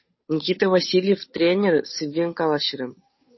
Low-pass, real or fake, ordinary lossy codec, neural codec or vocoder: 7.2 kHz; fake; MP3, 24 kbps; codec, 16 kHz, 8 kbps, FunCodec, trained on Chinese and English, 25 frames a second